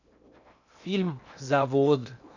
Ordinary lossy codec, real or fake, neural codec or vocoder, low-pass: none; fake; codec, 16 kHz in and 24 kHz out, 0.8 kbps, FocalCodec, streaming, 65536 codes; 7.2 kHz